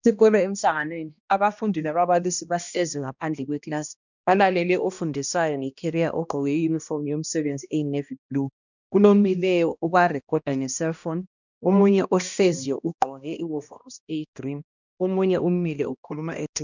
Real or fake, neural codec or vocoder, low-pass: fake; codec, 16 kHz, 1 kbps, X-Codec, HuBERT features, trained on balanced general audio; 7.2 kHz